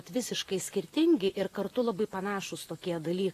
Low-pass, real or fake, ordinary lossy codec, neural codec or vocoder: 14.4 kHz; fake; AAC, 48 kbps; vocoder, 44.1 kHz, 128 mel bands, Pupu-Vocoder